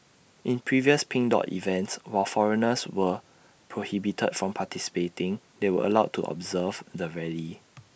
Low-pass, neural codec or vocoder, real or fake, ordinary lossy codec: none; none; real; none